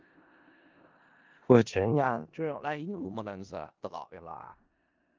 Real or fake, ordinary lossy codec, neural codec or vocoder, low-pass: fake; Opus, 24 kbps; codec, 16 kHz in and 24 kHz out, 0.4 kbps, LongCat-Audio-Codec, four codebook decoder; 7.2 kHz